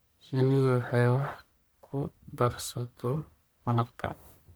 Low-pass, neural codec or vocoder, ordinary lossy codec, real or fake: none; codec, 44.1 kHz, 1.7 kbps, Pupu-Codec; none; fake